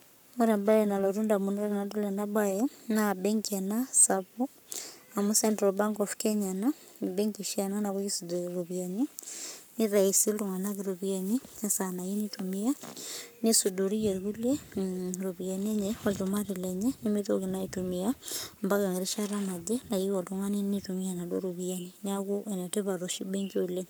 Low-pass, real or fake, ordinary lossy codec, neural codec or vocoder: none; fake; none; codec, 44.1 kHz, 7.8 kbps, Pupu-Codec